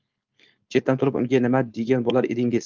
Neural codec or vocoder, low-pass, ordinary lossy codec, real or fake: codec, 16 kHz, 4.8 kbps, FACodec; 7.2 kHz; Opus, 24 kbps; fake